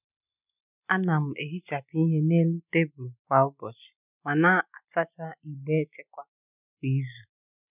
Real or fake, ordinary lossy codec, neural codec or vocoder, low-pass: real; none; none; 3.6 kHz